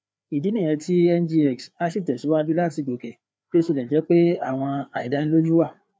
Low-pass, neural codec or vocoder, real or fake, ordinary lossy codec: none; codec, 16 kHz, 4 kbps, FreqCodec, larger model; fake; none